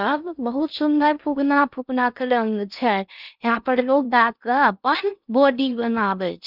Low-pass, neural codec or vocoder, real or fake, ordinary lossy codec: 5.4 kHz; codec, 16 kHz in and 24 kHz out, 0.6 kbps, FocalCodec, streaming, 2048 codes; fake; none